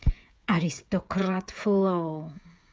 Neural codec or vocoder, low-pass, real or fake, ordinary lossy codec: codec, 16 kHz, 8 kbps, FreqCodec, smaller model; none; fake; none